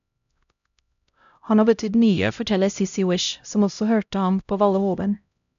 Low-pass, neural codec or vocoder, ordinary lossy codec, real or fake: 7.2 kHz; codec, 16 kHz, 0.5 kbps, X-Codec, HuBERT features, trained on LibriSpeech; AAC, 96 kbps; fake